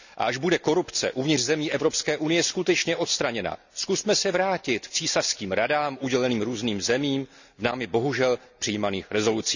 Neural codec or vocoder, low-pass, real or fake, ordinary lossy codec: none; 7.2 kHz; real; none